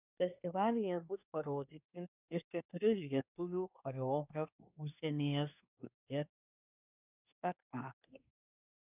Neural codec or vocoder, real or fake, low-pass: codec, 24 kHz, 1 kbps, SNAC; fake; 3.6 kHz